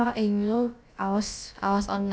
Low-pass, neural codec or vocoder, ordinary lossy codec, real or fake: none; codec, 16 kHz, about 1 kbps, DyCAST, with the encoder's durations; none; fake